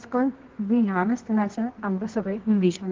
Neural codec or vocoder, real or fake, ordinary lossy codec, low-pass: codec, 24 kHz, 0.9 kbps, WavTokenizer, medium music audio release; fake; Opus, 16 kbps; 7.2 kHz